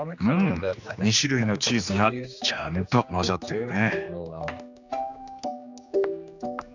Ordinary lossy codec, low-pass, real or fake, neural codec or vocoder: none; 7.2 kHz; fake; codec, 16 kHz, 2 kbps, X-Codec, HuBERT features, trained on general audio